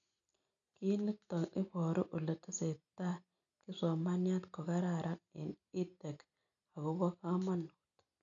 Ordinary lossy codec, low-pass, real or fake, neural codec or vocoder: none; 7.2 kHz; real; none